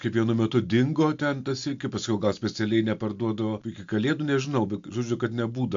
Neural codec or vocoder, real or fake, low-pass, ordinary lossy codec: none; real; 7.2 kHz; AAC, 64 kbps